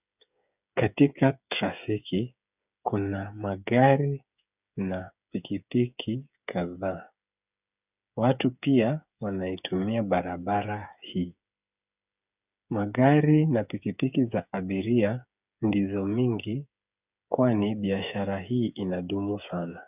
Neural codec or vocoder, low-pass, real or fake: codec, 16 kHz, 8 kbps, FreqCodec, smaller model; 3.6 kHz; fake